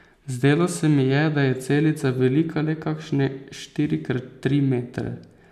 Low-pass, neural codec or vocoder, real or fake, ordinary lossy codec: 14.4 kHz; none; real; none